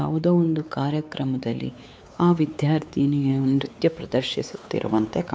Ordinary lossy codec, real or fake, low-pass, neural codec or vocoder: none; fake; none; codec, 16 kHz, 4 kbps, X-Codec, WavLM features, trained on Multilingual LibriSpeech